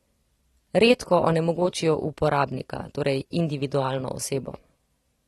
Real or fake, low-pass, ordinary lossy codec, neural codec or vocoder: fake; 19.8 kHz; AAC, 32 kbps; vocoder, 44.1 kHz, 128 mel bands every 256 samples, BigVGAN v2